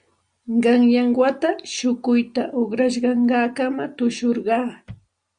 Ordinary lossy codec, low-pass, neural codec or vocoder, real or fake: Opus, 64 kbps; 9.9 kHz; none; real